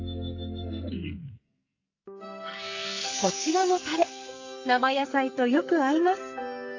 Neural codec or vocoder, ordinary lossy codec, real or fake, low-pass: codec, 44.1 kHz, 2.6 kbps, SNAC; AAC, 48 kbps; fake; 7.2 kHz